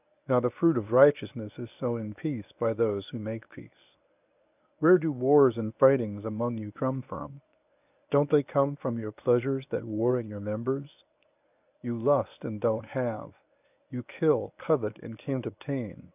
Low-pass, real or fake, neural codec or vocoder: 3.6 kHz; fake; codec, 24 kHz, 0.9 kbps, WavTokenizer, medium speech release version 1